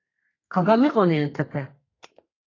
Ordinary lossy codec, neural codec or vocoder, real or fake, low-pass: AAC, 32 kbps; codec, 32 kHz, 1.9 kbps, SNAC; fake; 7.2 kHz